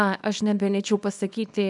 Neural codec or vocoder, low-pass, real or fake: codec, 24 kHz, 0.9 kbps, WavTokenizer, small release; 10.8 kHz; fake